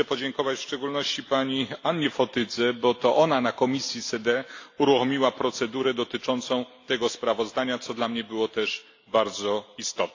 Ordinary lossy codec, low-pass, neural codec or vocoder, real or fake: AAC, 48 kbps; 7.2 kHz; none; real